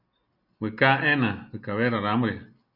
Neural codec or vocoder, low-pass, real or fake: none; 5.4 kHz; real